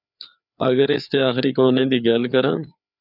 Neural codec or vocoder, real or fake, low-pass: codec, 16 kHz, 4 kbps, FreqCodec, larger model; fake; 5.4 kHz